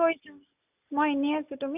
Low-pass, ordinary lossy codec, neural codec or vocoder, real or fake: 3.6 kHz; none; none; real